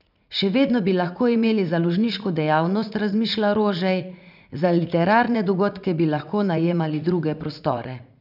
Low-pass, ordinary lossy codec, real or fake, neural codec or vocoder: 5.4 kHz; none; fake; vocoder, 44.1 kHz, 80 mel bands, Vocos